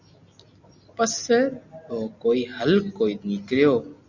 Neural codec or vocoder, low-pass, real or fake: none; 7.2 kHz; real